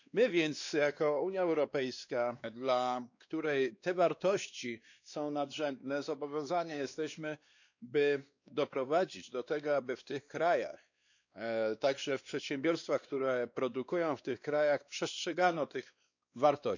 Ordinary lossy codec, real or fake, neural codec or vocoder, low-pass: none; fake; codec, 16 kHz, 2 kbps, X-Codec, WavLM features, trained on Multilingual LibriSpeech; 7.2 kHz